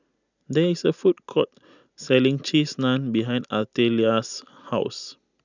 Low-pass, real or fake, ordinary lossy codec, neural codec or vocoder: 7.2 kHz; real; none; none